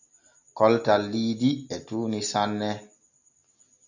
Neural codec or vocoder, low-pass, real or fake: none; 7.2 kHz; real